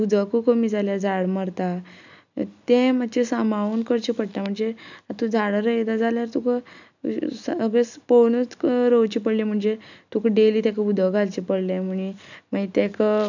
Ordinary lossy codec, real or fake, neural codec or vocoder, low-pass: none; real; none; 7.2 kHz